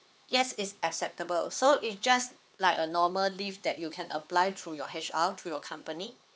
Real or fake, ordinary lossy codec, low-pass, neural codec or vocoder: fake; none; none; codec, 16 kHz, 4 kbps, X-Codec, HuBERT features, trained on LibriSpeech